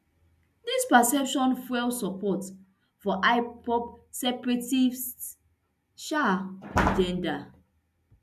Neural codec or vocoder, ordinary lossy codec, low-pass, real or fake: none; none; 14.4 kHz; real